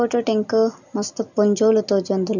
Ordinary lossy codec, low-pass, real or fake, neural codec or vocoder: none; 7.2 kHz; real; none